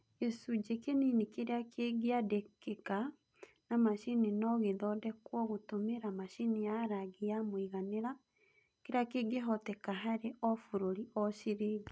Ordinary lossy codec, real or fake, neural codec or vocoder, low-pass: none; real; none; none